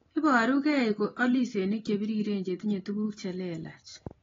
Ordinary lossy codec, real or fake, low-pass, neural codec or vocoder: AAC, 24 kbps; real; 7.2 kHz; none